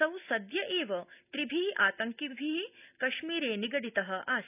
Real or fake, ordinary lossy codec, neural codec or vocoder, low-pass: real; none; none; 3.6 kHz